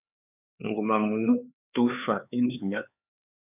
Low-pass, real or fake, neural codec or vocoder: 3.6 kHz; fake; codec, 16 kHz, 4 kbps, X-Codec, HuBERT features, trained on LibriSpeech